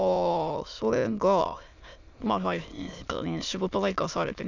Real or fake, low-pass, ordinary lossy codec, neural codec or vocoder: fake; 7.2 kHz; none; autoencoder, 22.05 kHz, a latent of 192 numbers a frame, VITS, trained on many speakers